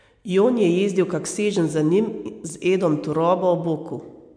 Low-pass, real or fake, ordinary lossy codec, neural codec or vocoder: 9.9 kHz; real; MP3, 64 kbps; none